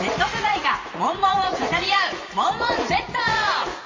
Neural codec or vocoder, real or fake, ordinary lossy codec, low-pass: vocoder, 44.1 kHz, 80 mel bands, Vocos; fake; MP3, 32 kbps; 7.2 kHz